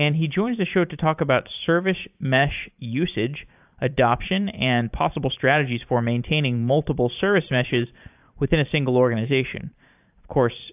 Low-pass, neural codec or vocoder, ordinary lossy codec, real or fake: 3.6 kHz; vocoder, 44.1 kHz, 128 mel bands every 512 samples, BigVGAN v2; AAC, 32 kbps; fake